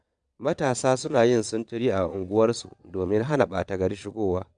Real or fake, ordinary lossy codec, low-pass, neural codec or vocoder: fake; none; 9.9 kHz; vocoder, 22.05 kHz, 80 mel bands, Vocos